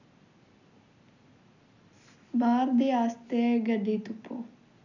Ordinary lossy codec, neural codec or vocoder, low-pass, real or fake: none; none; 7.2 kHz; real